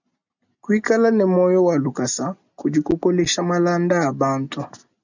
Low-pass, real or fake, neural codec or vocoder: 7.2 kHz; real; none